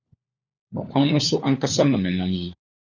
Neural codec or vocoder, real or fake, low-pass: codec, 16 kHz, 4 kbps, FunCodec, trained on LibriTTS, 50 frames a second; fake; 7.2 kHz